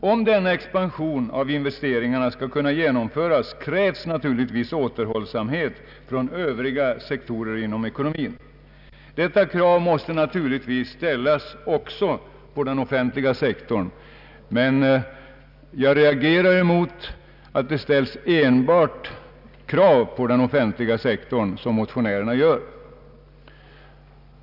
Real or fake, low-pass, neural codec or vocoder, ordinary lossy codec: real; 5.4 kHz; none; none